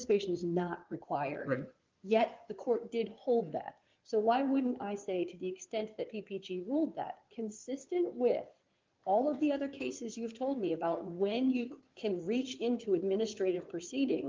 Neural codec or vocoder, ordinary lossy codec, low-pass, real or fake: codec, 16 kHz in and 24 kHz out, 2.2 kbps, FireRedTTS-2 codec; Opus, 32 kbps; 7.2 kHz; fake